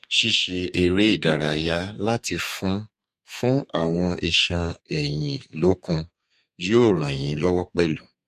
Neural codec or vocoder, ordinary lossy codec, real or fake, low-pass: codec, 32 kHz, 1.9 kbps, SNAC; MP3, 64 kbps; fake; 14.4 kHz